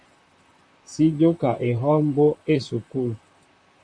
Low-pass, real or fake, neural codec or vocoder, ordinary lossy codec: 9.9 kHz; fake; vocoder, 44.1 kHz, 128 mel bands, Pupu-Vocoder; MP3, 64 kbps